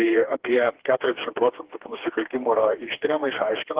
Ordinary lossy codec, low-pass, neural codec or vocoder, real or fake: Opus, 16 kbps; 3.6 kHz; codec, 16 kHz, 2 kbps, FreqCodec, smaller model; fake